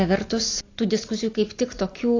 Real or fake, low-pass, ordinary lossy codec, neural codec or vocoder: real; 7.2 kHz; AAC, 32 kbps; none